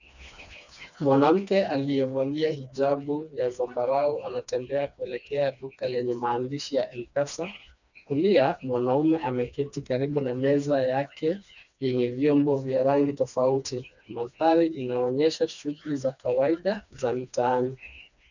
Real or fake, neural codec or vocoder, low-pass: fake; codec, 16 kHz, 2 kbps, FreqCodec, smaller model; 7.2 kHz